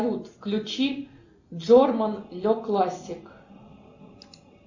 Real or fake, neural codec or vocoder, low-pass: real; none; 7.2 kHz